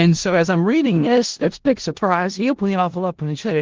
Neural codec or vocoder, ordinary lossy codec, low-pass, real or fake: codec, 16 kHz in and 24 kHz out, 0.4 kbps, LongCat-Audio-Codec, four codebook decoder; Opus, 16 kbps; 7.2 kHz; fake